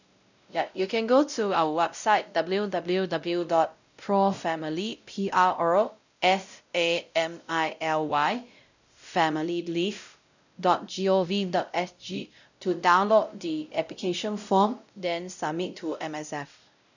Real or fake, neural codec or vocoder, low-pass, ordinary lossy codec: fake; codec, 16 kHz, 0.5 kbps, X-Codec, WavLM features, trained on Multilingual LibriSpeech; 7.2 kHz; none